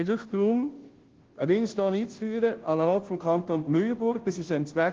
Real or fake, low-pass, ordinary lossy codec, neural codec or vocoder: fake; 7.2 kHz; Opus, 16 kbps; codec, 16 kHz, 0.5 kbps, FunCodec, trained on Chinese and English, 25 frames a second